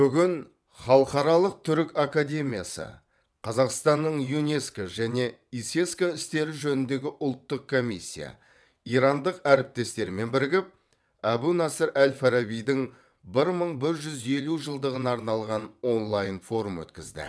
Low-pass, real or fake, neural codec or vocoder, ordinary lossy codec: none; fake; vocoder, 22.05 kHz, 80 mel bands, WaveNeXt; none